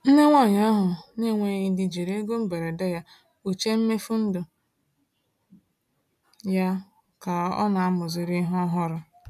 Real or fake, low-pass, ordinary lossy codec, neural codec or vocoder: real; 14.4 kHz; none; none